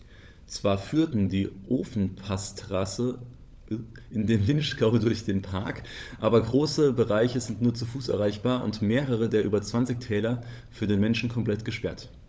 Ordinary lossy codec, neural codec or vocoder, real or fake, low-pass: none; codec, 16 kHz, 16 kbps, FunCodec, trained on LibriTTS, 50 frames a second; fake; none